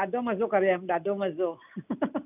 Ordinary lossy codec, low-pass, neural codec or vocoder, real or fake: none; 3.6 kHz; none; real